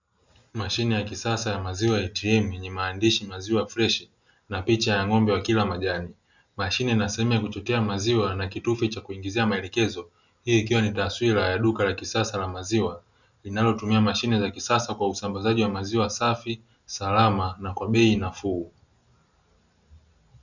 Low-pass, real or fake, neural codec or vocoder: 7.2 kHz; real; none